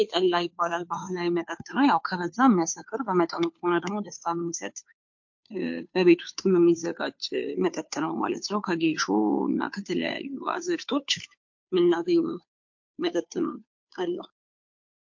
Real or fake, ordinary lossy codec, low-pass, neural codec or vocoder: fake; MP3, 48 kbps; 7.2 kHz; codec, 16 kHz, 2 kbps, FunCodec, trained on Chinese and English, 25 frames a second